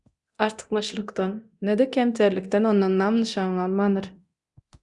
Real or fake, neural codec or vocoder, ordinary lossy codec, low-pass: fake; codec, 24 kHz, 0.9 kbps, DualCodec; Opus, 64 kbps; 10.8 kHz